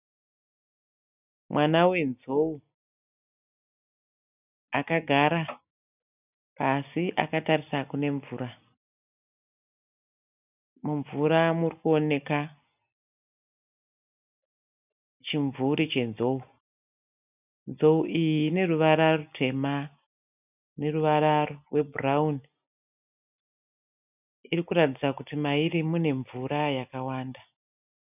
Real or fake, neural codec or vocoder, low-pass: real; none; 3.6 kHz